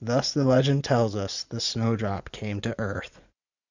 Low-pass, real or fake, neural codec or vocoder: 7.2 kHz; fake; vocoder, 44.1 kHz, 80 mel bands, Vocos